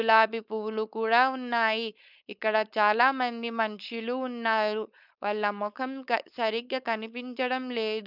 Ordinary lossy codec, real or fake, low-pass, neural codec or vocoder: none; fake; 5.4 kHz; codec, 16 kHz, 4.8 kbps, FACodec